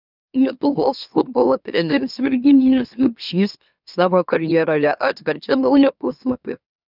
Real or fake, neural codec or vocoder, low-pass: fake; autoencoder, 44.1 kHz, a latent of 192 numbers a frame, MeloTTS; 5.4 kHz